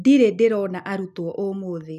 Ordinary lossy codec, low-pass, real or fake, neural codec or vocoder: none; 14.4 kHz; real; none